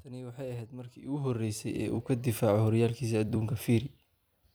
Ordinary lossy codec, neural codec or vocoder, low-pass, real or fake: none; vocoder, 44.1 kHz, 128 mel bands every 256 samples, BigVGAN v2; none; fake